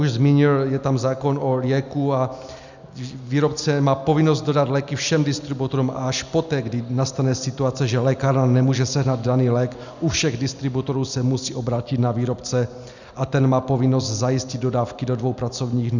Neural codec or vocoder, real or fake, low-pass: none; real; 7.2 kHz